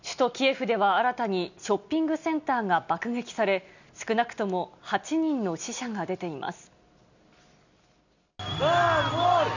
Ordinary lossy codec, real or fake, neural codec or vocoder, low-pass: none; real; none; 7.2 kHz